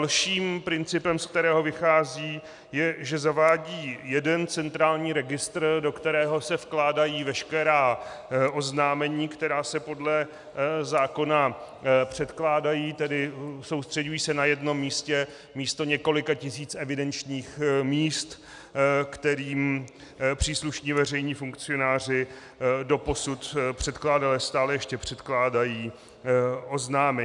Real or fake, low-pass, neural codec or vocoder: real; 10.8 kHz; none